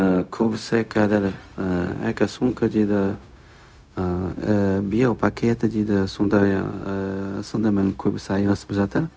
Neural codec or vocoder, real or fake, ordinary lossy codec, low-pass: codec, 16 kHz, 0.4 kbps, LongCat-Audio-Codec; fake; none; none